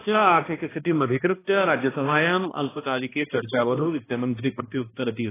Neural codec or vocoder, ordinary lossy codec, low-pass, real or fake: codec, 16 kHz, 1 kbps, X-Codec, HuBERT features, trained on balanced general audio; AAC, 16 kbps; 3.6 kHz; fake